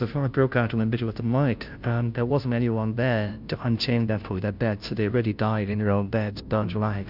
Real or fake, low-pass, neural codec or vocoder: fake; 5.4 kHz; codec, 16 kHz, 0.5 kbps, FunCodec, trained on Chinese and English, 25 frames a second